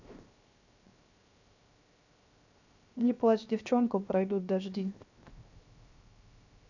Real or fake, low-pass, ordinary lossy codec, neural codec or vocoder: fake; 7.2 kHz; none; codec, 16 kHz, 0.7 kbps, FocalCodec